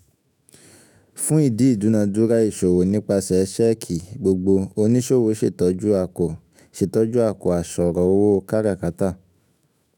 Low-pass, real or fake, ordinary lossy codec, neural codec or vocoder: 19.8 kHz; fake; none; autoencoder, 48 kHz, 128 numbers a frame, DAC-VAE, trained on Japanese speech